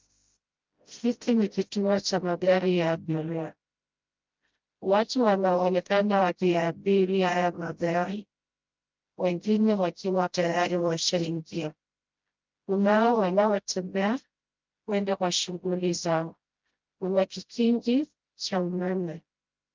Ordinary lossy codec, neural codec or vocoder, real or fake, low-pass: Opus, 24 kbps; codec, 16 kHz, 0.5 kbps, FreqCodec, smaller model; fake; 7.2 kHz